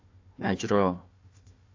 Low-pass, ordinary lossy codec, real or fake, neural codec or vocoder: 7.2 kHz; AAC, 48 kbps; fake; codec, 16 kHz, 1 kbps, FunCodec, trained on Chinese and English, 50 frames a second